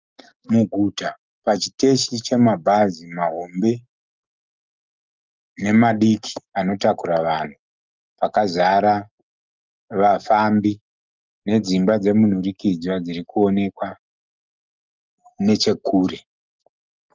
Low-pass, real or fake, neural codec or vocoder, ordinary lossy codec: 7.2 kHz; real; none; Opus, 24 kbps